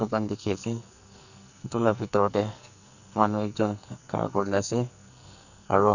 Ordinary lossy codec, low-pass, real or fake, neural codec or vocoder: none; 7.2 kHz; fake; codec, 44.1 kHz, 2.6 kbps, SNAC